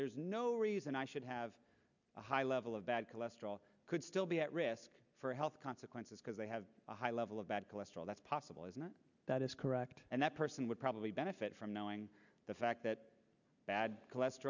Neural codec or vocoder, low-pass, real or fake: none; 7.2 kHz; real